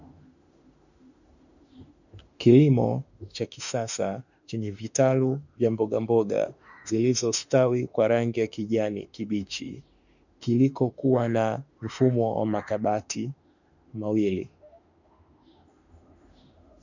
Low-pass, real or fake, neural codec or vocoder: 7.2 kHz; fake; autoencoder, 48 kHz, 32 numbers a frame, DAC-VAE, trained on Japanese speech